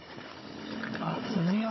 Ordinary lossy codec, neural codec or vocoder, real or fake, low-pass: MP3, 24 kbps; codec, 16 kHz, 16 kbps, FunCodec, trained on Chinese and English, 50 frames a second; fake; 7.2 kHz